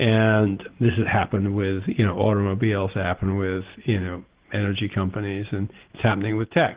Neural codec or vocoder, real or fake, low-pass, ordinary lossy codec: none; real; 3.6 kHz; Opus, 16 kbps